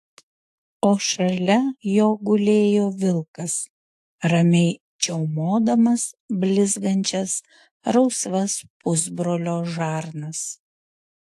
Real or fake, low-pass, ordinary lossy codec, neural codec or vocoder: fake; 14.4 kHz; AAC, 64 kbps; autoencoder, 48 kHz, 128 numbers a frame, DAC-VAE, trained on Japanese speech